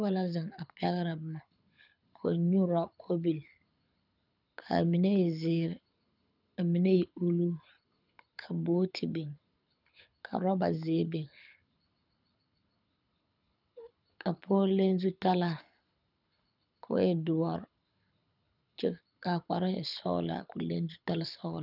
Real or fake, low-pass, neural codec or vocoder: fake; 5.4 kHz; codec, 24 kHz, 6 kbps, HILCodec